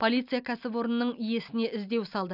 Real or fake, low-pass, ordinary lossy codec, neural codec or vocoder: real; 5.4 kHz; none; none